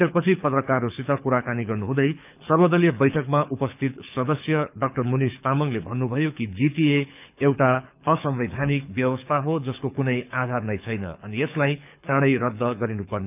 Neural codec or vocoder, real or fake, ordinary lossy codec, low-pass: codec, 24 kHz, 6 kbps, HILCodec; fake; none; 3.6 kHz